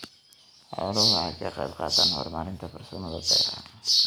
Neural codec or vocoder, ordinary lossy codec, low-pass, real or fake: none; none; none; real